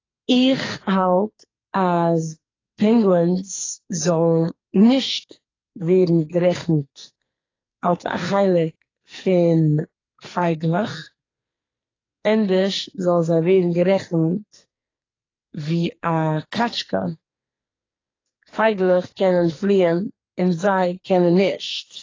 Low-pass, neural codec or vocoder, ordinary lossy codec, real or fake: 7.2 kHz; codec, 44.1 kHz, 2.6 kbps, SNAC; AAC, 32 kbps; fake